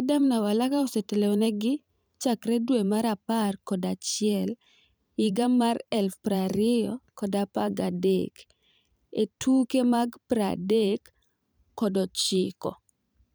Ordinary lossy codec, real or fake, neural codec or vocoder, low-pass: none; fake; vocoder, 44.1 kHz, 128 mel bands every 512 samples, BigVGAN v2; none